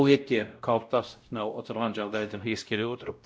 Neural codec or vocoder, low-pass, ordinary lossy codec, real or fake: codec, 16 kHz, 0.5 kbps, X-Codec, WavLM features, trained on Multilingual LibriSpeech; none; none; fake